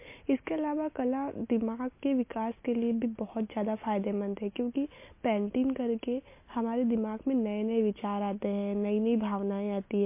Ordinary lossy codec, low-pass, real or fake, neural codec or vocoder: MP3, 24 kbps; 3.6 kHz; real; none